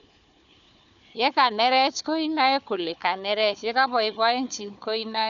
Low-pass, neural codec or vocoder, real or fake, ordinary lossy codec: 7.2 kHz; codec, 16 kHz, 4 kbps, FunCodec, trained on Chinese and English, 50 frames a second; fake; none